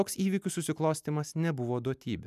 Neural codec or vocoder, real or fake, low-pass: none; real; 14.4 kHz